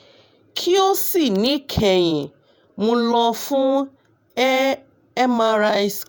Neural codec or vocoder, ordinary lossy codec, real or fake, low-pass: vocoder, 48 kHz, 128 mel bands, Vocos; none; fake; none